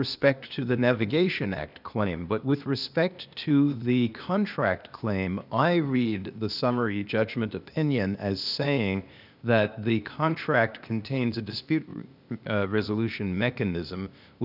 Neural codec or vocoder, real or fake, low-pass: codec, 16 kHz, 0.8 kbps, ZipCodec; fake; 5.4 kHz